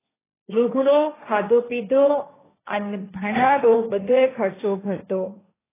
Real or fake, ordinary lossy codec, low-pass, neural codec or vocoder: fake; AAC, 16 kbps; 3.6 kHz; codec, 16 kHz, 1.1 kbps, Voila-Tokenizer